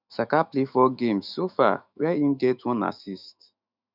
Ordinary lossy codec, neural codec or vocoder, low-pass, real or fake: none; autoencoder, 48 kHz, 128 numbers a frame, DAC-VAE, trained on Japanese speech; 5.4 kHz; fake